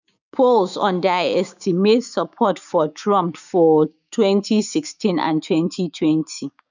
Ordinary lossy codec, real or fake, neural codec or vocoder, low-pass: none; fake; codec, 16 kHz, 6 kbps, DAC; 7.2 kHz